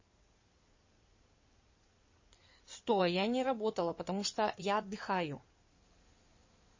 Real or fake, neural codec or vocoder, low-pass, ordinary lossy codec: fake; codec, 16 kHz in and 24 kHz out, 2.2 kbps, FireRedTTS-2 codec; 7.2 kHz; MP3, 32 kbps